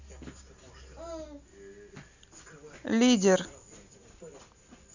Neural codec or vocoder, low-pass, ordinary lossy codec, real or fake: none; 7.2 kHz; none; real